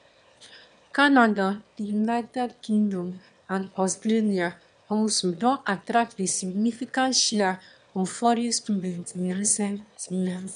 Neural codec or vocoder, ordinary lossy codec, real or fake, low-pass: autoencoder, 22.05 kHz, a latent of 192 numbers a frame, VITS, trained on one speaker; none; fake; 9.9 kHz